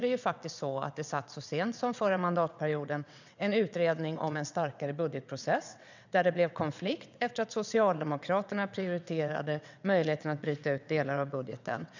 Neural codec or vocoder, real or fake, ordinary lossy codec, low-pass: vocoder, 22.05 kHz, 80 mel bands, WaveNeXt; fake; none; 7.2 kHz